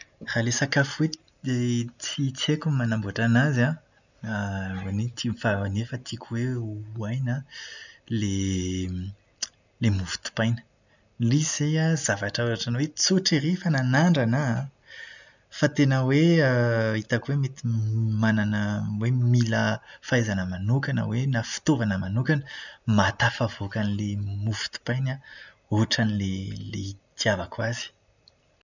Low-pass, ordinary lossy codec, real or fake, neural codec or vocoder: 7.2 kHz; none; real; none